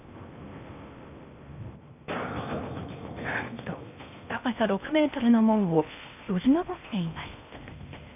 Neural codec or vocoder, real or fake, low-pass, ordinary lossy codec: codec, 16 kHz in and 24 kHz out, 0.8 kbps, FocalCodec, streaming, 65536 codes; fake; 3.6 kHz; none